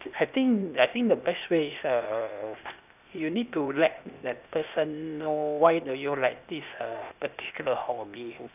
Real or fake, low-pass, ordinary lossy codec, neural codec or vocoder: fake; 3.6 kHz; none; codec, 16 kHz, 0.8 kbps, ZipCodec